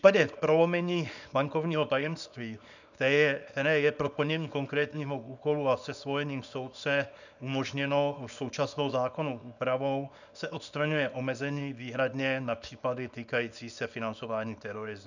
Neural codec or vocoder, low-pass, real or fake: codec, 24 kHz, 0.9 kbps, WavTokenizer, small release; 7.2 kHz; fake